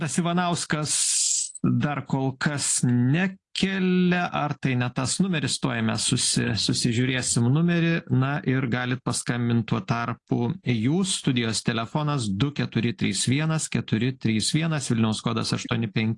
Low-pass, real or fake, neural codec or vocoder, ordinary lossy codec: 10.8 kHz; real; none; AAC, 48 kbps